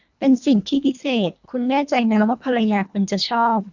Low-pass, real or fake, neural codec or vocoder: 7.2 kHz; fake; codec, 24 kHz, 1.5 kbps, HILCodec